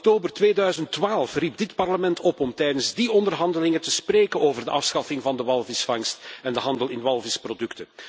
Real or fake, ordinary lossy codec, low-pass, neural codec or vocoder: real; none; none; none